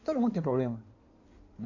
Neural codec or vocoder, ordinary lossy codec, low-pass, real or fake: codec, 16 kHz in and 24 kHz out, 2.2 kbps, FireRedTTS-2 codec; AAC, 48 kbps; 7.2 kHz; fake